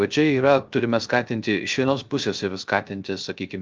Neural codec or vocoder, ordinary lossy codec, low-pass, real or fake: codec, 16 kHz, 0.3 kbps, FocalCodec; Opus, 24 kbps; 7.2 kHz; fake